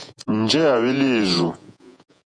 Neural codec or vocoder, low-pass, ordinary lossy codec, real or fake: vocoder, 48 kHz, 128 mel bands, Vocos; 9.9 kHz; MP3, 96 kbps; fake